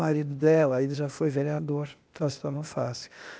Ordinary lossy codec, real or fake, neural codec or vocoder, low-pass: none; fake; codec, 16 kHz, 0.8 kbps, ZipCodec; none